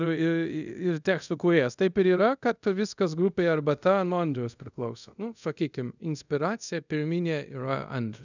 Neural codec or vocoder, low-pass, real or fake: codec, 24 kHz, 0.5 kbps, DualCodec; 7.2 kHz; fake